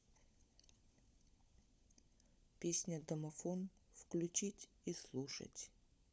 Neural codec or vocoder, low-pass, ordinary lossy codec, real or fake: codec, 16 kHz, 16 kbps, FunCodec, trained on LibriTTS, 50 frames a second; none; none; fake